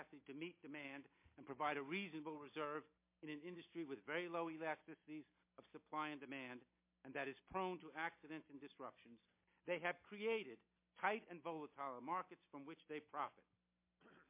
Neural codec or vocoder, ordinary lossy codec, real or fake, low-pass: none; MP3, 24 kbps; real; 3.6 kHz